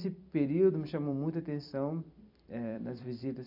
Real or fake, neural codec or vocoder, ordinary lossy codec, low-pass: fake; vocoder, 44.1 kHz, 128 mel bands every 256 samples, BigVGAN v2; none; 5.4 kHz